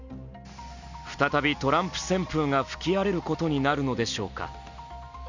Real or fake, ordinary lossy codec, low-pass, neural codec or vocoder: real; none; 7.2 kHz; none